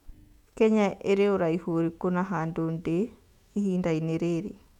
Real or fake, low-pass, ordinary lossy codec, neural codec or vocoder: fake; 19.8 kHz; none; autoencoder, 48 kHz, 128 numbers a frame, DAC-VAE, trained on Japanese speech